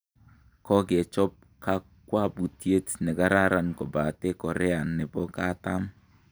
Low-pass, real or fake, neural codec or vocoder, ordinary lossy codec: none; real; none; none